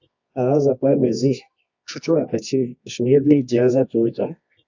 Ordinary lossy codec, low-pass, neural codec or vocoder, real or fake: AAC, 48 kbps; 7.2 kHz; codec, 24 kHz, 0.9 kbps, WavTokenizer, medium music audio release; fake